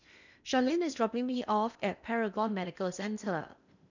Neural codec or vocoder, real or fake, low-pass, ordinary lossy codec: codec, 16 kHz in and 24 kHz out, 0.8 kbps, FocalCodec, streaming, 65536 codes; fake; 7.2 kHz; none